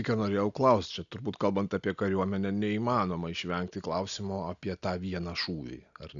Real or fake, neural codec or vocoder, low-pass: real; none; 7.2 kHz